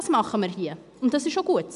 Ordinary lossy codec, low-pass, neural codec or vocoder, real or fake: none; 10.8 kHz; none; real